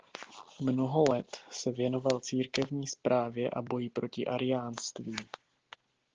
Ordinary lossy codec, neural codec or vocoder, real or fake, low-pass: Opus, 16 kbps; none; real; 7.2 kHz